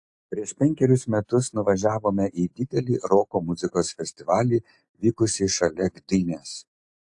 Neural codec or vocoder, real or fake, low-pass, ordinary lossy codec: none; real; 10.8 kHz; AAC, 64 kbps